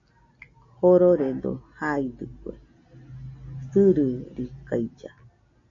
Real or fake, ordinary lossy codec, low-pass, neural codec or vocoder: real; MP3, 96 kbps; 7.2 kHz; none